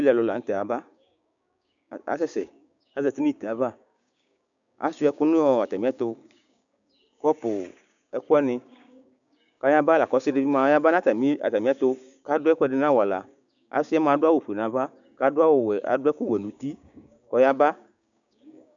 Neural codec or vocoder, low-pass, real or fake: codec, 16 kHz, 6 kbps, DAC; 7.2 kHz; fake